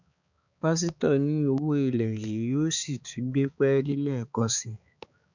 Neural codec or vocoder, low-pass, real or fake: codec, 16 kHz, 4 kbps, X-Codec, HuBERT features, trained on balanced general audio; 7.2 kHz; fake